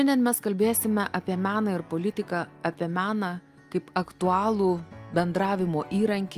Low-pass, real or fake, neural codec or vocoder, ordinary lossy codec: 14.4 kHz; real; none; Opus, 32 kbps